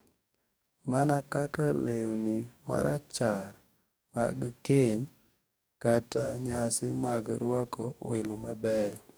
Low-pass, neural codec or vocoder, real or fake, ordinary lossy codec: none; codec, 44.1 kHz, 2.6 kbps, DAC; fake; none